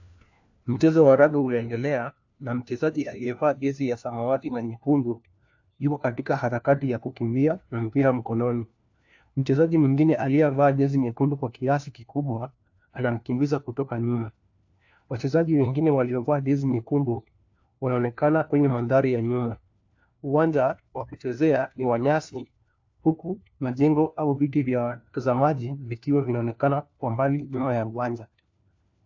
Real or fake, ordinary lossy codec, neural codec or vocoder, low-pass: fake; AAC, 48 kbps; codec, 16 kHz, 1 kbps, FunCodec, trained on LibriTTS, 50 frames a second; 7.2 kHz